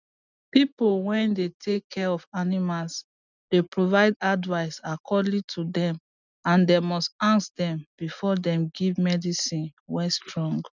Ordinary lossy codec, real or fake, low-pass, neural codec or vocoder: none; real; 7.2 kHz; none